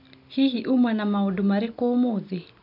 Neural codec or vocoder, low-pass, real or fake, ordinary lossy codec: none; 5.4 kHz; real; none